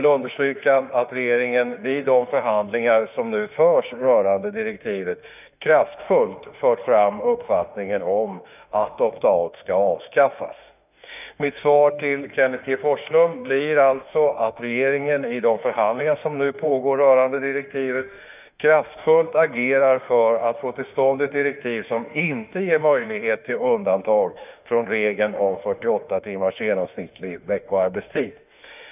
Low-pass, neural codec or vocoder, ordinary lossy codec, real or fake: 3.6 kHz; autoencoder, 48 kHz, 32 numbers a frame, DAC-VAE, trained on Japanese speech; none; fake